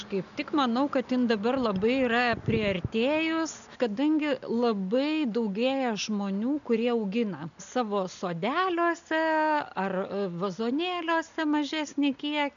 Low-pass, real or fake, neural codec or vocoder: 7.2 kHz; real; none